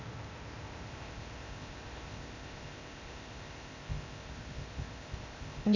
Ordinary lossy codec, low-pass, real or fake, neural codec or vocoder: none; 7.2 kHz; fake; codec, 16 kHz, 0.8 kbps, ZipCodec